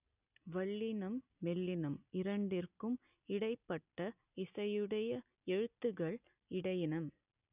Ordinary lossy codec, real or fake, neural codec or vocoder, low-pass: none; real; none; 3.6 kHz